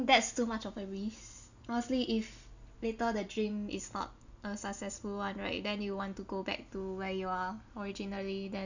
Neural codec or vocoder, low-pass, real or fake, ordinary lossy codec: none; 7.2 kHz; real; none